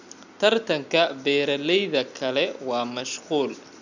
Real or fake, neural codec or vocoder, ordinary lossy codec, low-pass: real; none; none; 7.2 kHz